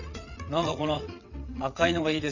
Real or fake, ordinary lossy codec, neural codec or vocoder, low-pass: fake; none; vocoder, 22.05 kHz, 80 mel bands, WaveNeXt; 7.2 kHz